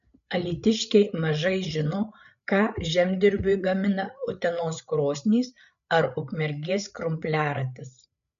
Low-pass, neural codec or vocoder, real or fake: 7.2 kHz; codec, 16 kHz, 8 kbps, FreqCodec, larger model; fake